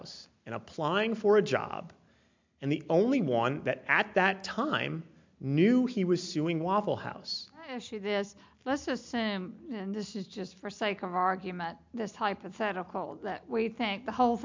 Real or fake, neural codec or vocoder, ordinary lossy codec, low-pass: real; none; MP3, 64 kbps; 7.2 kHz